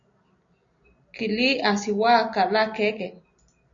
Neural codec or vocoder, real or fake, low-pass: none; real; 7.2 kHz